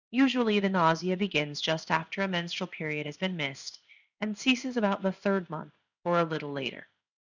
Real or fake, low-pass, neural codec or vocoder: fake; 7.2 kHz; codec, 16 kHz in and 24 kHz out, 1 kbps, XY-Tokenizer